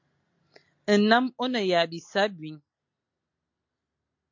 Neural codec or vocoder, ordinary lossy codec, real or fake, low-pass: none; MP3, 48 kbps; real; 7.2 kHz